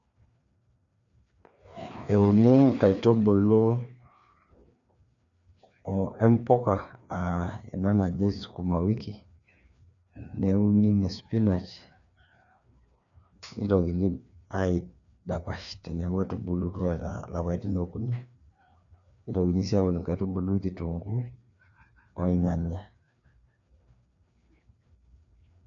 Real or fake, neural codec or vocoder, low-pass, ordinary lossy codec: fake; codec, 16 kHz, 2 kbps, FreqCodec, larger model; 7.2 kHz; none